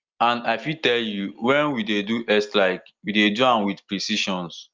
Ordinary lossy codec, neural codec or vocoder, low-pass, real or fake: Opus, 24 kbps; none; 7.2 kHz; real